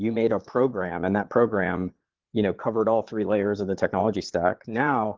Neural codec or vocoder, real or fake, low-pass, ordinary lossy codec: vocoder, 22.05 kHz, 80 mel bands, Vocos; fake; 7.2 kHz; Opus, 16 kbps